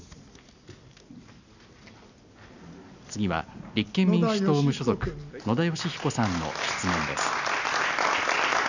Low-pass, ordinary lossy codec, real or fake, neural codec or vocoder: 7.2 kHz; none; real; none